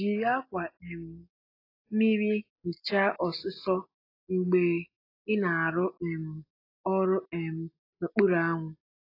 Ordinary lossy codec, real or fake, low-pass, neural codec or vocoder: AAC, 24 kbps; real; 5.4 kHz; none